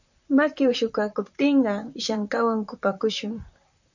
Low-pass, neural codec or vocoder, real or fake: 7.2 kHz; vocoder, 44.1 kHz, 128 mel bands, Pupu-Vocoder; fake